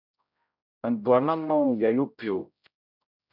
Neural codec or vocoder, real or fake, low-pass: codec, 16 kHz, 0.5 kbps, X-Codec, HuBERT features, trained on general audio; fake; 5.4 kHz